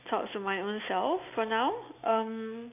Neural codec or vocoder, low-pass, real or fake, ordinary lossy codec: none; 3.6 kHz; real; none